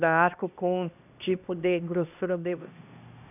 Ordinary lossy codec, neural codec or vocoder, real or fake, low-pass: none; codec, 16 kHz, 1 kbps, X-Codec, HuBERT features, trained on LibriSpeech; fake; 3.6 kHz